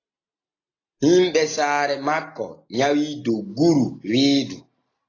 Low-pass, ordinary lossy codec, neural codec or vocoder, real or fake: 7.2 kHz; AAC, 32 kbps; none; real